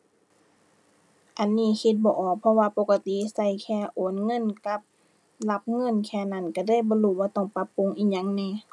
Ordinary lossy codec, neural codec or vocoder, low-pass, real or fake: none; none; none; real